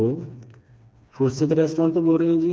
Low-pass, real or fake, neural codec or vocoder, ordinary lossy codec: none; fake; codec, 16 kHz, 2 kbps, FreqCodec, smaller model; none